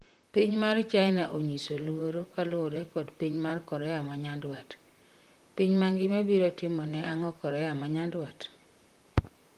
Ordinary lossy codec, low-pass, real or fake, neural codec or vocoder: Opus, 24 kbps; 14.4 kHz; fake; vocoder, 44.1 kHz, 128 mel bands, Pupu-Vocoder